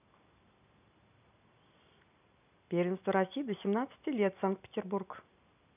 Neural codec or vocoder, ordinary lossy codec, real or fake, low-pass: none; none; real; 3.6 kHz